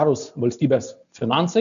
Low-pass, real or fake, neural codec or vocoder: 7.2 kHz; real; none